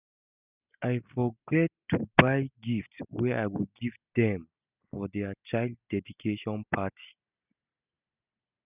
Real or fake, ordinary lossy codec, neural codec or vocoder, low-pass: real; none; none; 3.6 kHz